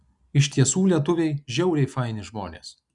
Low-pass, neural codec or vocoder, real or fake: 10.8 kHz; none; real